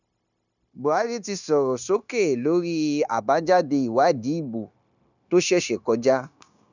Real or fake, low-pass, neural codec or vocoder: fake; 7.2 kHz; codec, 16 kHz, 0.9 kbps, LongCat-Audio-Codec